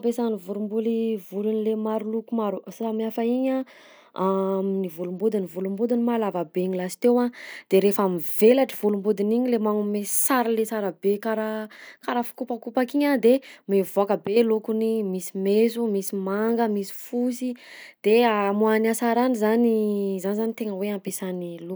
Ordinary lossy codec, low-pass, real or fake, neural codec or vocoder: none; none; real; none